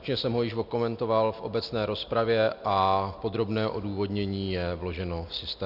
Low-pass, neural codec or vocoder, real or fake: 5.4 kHz; none; real